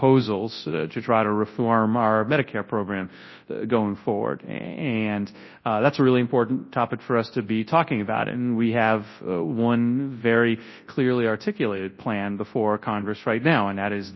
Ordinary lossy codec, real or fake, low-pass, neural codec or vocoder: MP3, 24 kbps; fake; 7.2 kHz; codec, 24 kHz, 0.9 kbps, WavTokenizer, large speech release